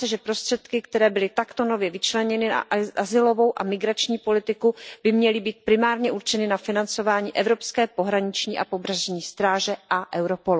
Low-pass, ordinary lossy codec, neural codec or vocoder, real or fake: none; none; none; real